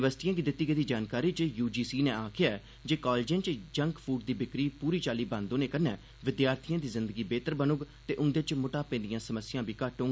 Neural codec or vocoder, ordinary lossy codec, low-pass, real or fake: none; none; none; real